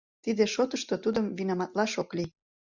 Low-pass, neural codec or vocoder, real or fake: 7.2 kHz; none; real